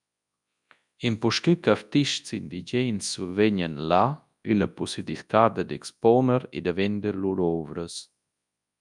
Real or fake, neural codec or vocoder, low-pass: fake; codec, 24 kHz, 0.9 kbps, WavTokenizer, large speech release; 10.8 kHz